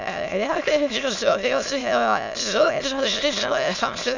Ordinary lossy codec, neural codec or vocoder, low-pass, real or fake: none; autoencoder, 22.05 kHz, a latent of 192 numbers a frame, VITS, trained on many speakers; 7.2 kHz; fake